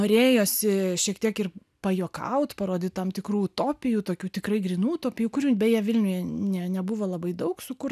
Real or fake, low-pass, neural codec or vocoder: real; 14.4 kHz; none